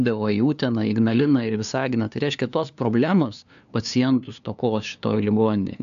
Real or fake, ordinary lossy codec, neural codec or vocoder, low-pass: fake; AAC, 96 kbps; codec, 16 kHz, 2 kbps, FunCodec, trained on LibriTTS, 25 frames a second; 7.2 kHz